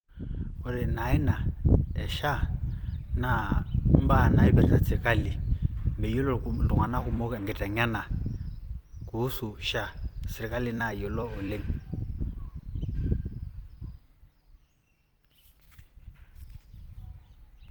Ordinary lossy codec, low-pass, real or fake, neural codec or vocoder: Opus, 32 kbps; 19.8 kHz; fake; vocoder, 44.1 kHz, 128 mel bands every 256 samples, BigVGAN v2